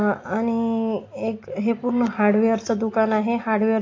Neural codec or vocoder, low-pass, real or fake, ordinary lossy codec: none; 7.2 kHz; real; AAC, 32 kbps